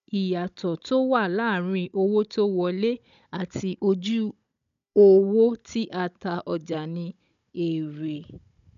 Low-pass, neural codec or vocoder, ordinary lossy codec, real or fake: 7.2 kHz; codec, 16 kHz, 16 kbps, FunCodec, trained on Chinese and English, 50 frames a second; MP3, 96 kbps; fake